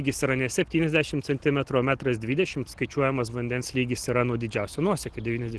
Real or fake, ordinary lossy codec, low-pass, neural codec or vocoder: real; Opus, 16 kbps; 10.8 kHz; none